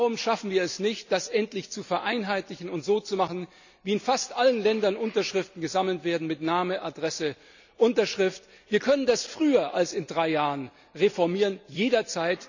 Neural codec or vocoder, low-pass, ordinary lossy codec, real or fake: none; 7.2 kHz; none; real